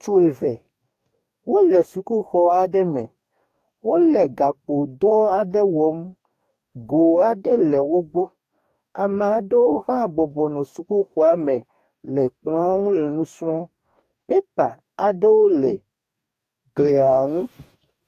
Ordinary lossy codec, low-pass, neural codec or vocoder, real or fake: AAC, 64 kbps; 14.4 kHz; codec, 44.1 kHz, 2.6 kbps, DAC; fake